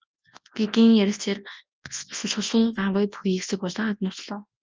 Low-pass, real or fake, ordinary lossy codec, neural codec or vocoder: 7.2 kHz; fake; Opus, 24 kbps; codec, 24 kHz, 0.9 kbps, WavTokenizer, large speech release